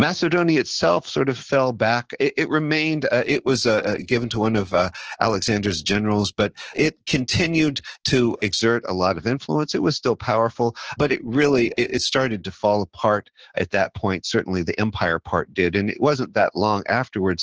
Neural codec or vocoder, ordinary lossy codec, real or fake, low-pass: codec, 16 kHz, 6 kbps, DAC; Opus, 16 kbps; fake; 7.2 kHz